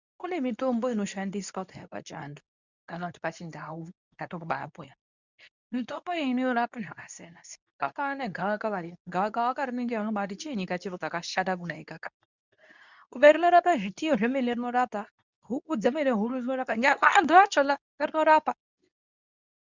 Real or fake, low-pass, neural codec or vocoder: fake; 7.2 kHz; codec, 24 kHz, 0.9 kbps, WavTokenizer, medium speech release version 2